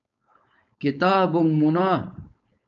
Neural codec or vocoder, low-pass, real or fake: codec, 16 kHz, 4.8 kbps, FACodec; 7.2 kHz; fake